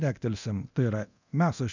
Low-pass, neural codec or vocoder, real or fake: 7.2 kHz; codec, 24 kHz, 0.9 kbps, DualCodec; fake